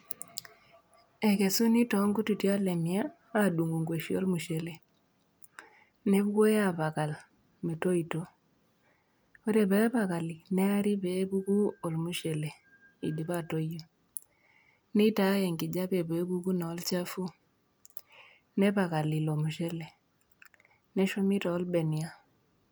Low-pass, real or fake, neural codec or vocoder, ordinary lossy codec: none; real; none; none